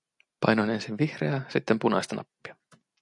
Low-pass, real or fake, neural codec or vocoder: 10.8 kHz; real; none